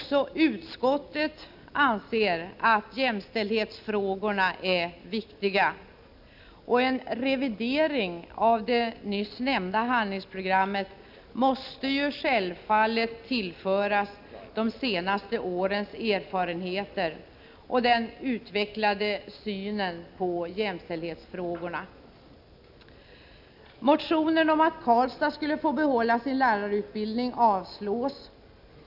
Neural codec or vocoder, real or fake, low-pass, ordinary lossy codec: none; real; 5.4 kHz; none